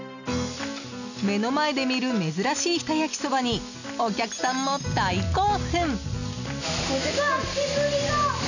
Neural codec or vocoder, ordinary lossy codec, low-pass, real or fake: none; none; 7.2 kHz; real